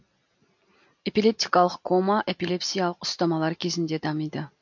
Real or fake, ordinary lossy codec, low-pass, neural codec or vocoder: real; MP3, 48 kbps; 7.2 kHz; none